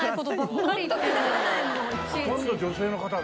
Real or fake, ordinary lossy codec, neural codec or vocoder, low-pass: real; none; none; none